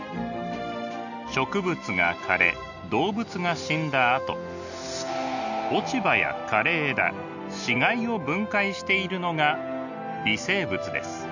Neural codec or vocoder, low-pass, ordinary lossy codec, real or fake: none; 7.2 kHz; none; real